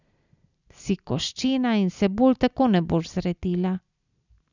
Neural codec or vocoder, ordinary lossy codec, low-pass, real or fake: none; none; 7.2 kHz; real